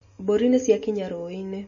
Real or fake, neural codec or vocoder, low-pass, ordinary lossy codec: real; none; 7.2 kHz; MP3, 32 kbps